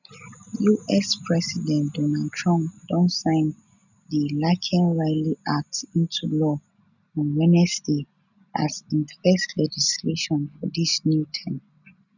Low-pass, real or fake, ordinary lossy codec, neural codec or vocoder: 7.2 kHz; real; none; none